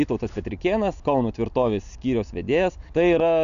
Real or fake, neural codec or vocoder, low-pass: real; none; 7.2 kHz